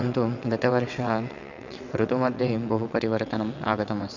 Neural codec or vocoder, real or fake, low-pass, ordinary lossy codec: vocoder, 22.05 kHz, 80 mel bands, WaveNeXt; fake; 7.2 kHz; none